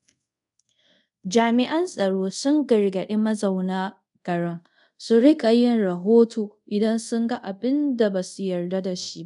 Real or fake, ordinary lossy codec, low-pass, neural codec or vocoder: fake; none; 10.8 kHz; codec, 24 kHz, 0.5 kbps, DualCodec